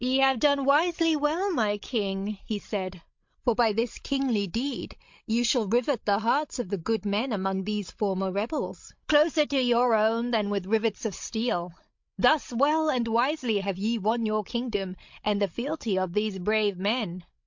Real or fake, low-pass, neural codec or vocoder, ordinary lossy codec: fake; 7.2 kHz; codec, 16 kHz, 16 kbps, FreqCodec, larger model; MP3, 48 kbps